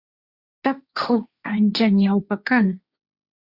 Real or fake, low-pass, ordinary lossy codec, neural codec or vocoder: fake; 5.4 kHz; Opus, 64 kbps; codec, 16 kHz, 1.1 kbps, Voila-Tokenizer